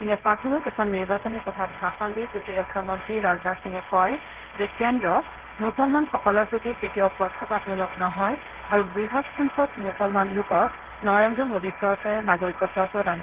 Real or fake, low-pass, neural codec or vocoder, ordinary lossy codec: fake; 3.6 kHz; codec, 16 kHz, 1.1 kbps, Voila-Tokenizer; Opus, 16 kbps